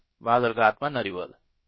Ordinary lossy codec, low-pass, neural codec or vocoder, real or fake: MP3, 24 kbps; 7.2 kHz; codec, 16 kHz, about 1 kbps, DyCAST, with the encoder's durations; fake